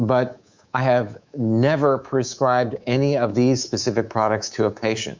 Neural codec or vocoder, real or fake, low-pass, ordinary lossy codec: codec, 24 kHz, 3.1 kbps, DualCodec; fake; 7.2 kHz; MP3, 64 kbps